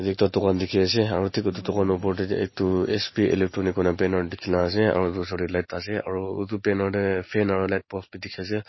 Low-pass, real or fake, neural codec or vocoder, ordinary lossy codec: 7.2 kHz; real; none; MP3, 24 kbps